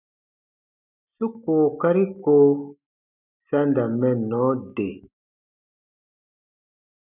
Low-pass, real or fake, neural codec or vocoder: 3.6 kHz; real; none